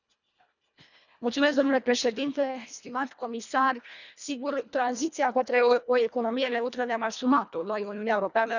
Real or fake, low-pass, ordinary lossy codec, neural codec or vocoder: fake; 7.2 kHz; none; codec, 24 kHz, 1.5 kbps, HILCodec